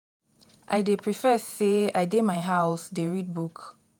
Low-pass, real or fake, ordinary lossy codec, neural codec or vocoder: none; fake; none; vocoder, 48 kHz, 128 mel bands, Vocos